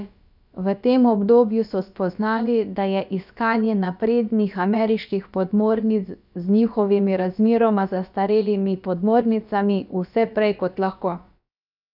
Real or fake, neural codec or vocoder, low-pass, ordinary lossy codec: fake; codec, 16 kHz, about 1 kbps, DyCAST, with the encoder's durations; 5.4 kHz; none